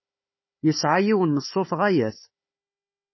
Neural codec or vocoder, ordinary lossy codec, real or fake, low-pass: codec, 16 kHz, 4 kbps, FunCodec, trained on Chinese and English, 50 frames a second; MP3, 24 kbps; fake; 7.2 kHz